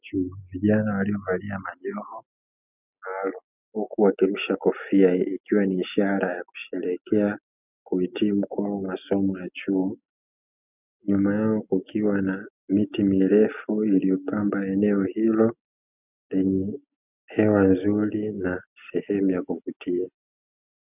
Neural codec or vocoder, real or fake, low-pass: none; real; 3.6 kHz